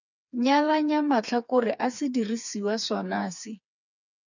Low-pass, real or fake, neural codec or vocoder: 7.2 kHz; fake; codec, 16 kHz, 4 kbps, FreqCodec, smaller model